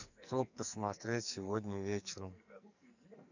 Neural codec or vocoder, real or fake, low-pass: codec, 44.1 kHz, 2.6 kbps, SNAC; fake; 7.2 kHz